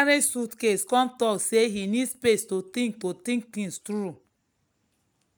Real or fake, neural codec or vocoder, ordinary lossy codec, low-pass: real; none; none; none